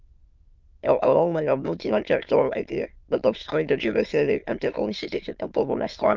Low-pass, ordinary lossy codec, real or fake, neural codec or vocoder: 7.2 kHz; Opus, 32 kbps; fake; autoencoder, 22.05 kHz, a latent of 192 numbers a frame, VITS, trained on many speakers